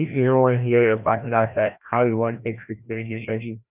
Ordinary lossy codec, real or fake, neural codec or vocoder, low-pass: none; fake; codec, 16 kHz, 1 kbps, FreqCodec, larger model; 3.6 kHz